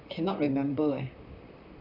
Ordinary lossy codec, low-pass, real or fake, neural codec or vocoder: none; 5.4 kHz; fake; vocoder, 44.1 kHz, 128 mel bands, Pupu-Vocoder